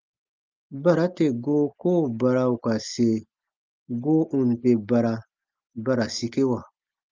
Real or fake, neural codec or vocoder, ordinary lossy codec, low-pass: real; none; Opus, 32 kbps; 7.2 kHz